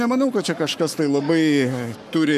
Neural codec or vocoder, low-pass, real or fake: codec, 44.1 kHz, 7.8 kbps, Pupu-Codec; 14.4 kHz; fake